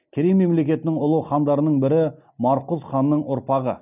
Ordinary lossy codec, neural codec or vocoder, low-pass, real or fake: none; none; 3.6 kHz; real